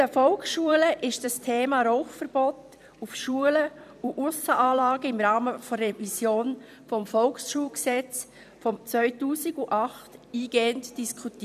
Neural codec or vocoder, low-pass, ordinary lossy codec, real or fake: vocoder, 44.1 kHz, 128 mel bands every 256 samples, BigVGAN v2; 14.4 kHz; none; fake